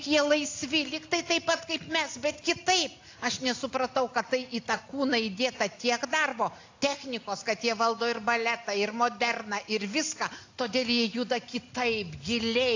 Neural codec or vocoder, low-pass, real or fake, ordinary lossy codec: none; 7.2 kHz; real; AAC, 48 kbps